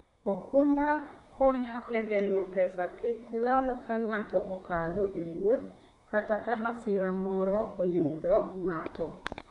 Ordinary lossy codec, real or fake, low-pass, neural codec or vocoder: none; fake; 10.8 kHz; codec, 24 kHz, 1 kbps, SNAC